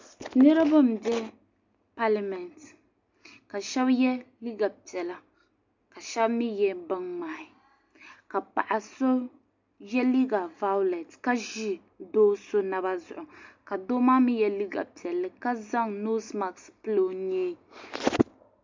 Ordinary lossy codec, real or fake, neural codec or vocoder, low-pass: MP3, 64 kbps; real; none; 7.2 kHz